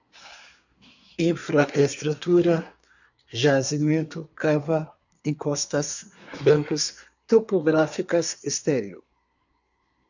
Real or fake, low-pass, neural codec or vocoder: fake; 7.2 kHz; codec, 24 kHz, 1 kbps, SNAC